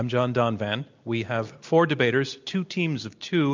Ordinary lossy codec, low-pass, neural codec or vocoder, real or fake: MP3, 64 kbps; 7.2 kHz; none; real